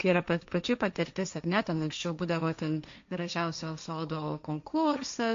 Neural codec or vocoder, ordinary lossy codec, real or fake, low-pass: codec, 16 kHz, 1.1 kbps, Voila-Tokenizer; MP3, 48 kbps; fake; 7.2 kHz